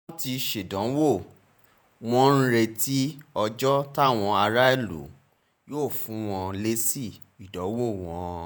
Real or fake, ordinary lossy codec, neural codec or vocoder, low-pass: fake; none; vocoder, 48 kHz, 128 mel bands, Vocos; none